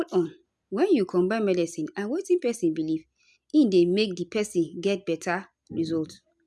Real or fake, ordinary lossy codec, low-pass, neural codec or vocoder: real; none; none; none